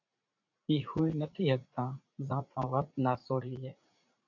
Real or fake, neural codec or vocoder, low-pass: fake; vocoder, 44.1 kHz, 80 mel bands, Vocos; 7.2 kHz